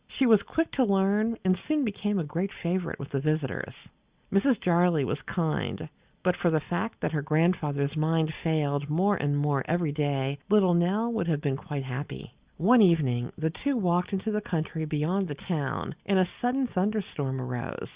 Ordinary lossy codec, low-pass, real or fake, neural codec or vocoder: Opus, 64 kbps; 3.6 kHz; real; none